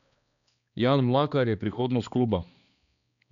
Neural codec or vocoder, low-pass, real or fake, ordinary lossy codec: codec, 16 kHz, 2 kbps, X-Codec, HuBERT features, trained on balanced general audio; 7.2 kHz; fake; none